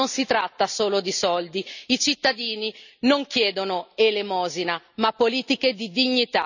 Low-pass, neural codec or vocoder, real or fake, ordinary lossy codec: 7.2 kHz; none; real; none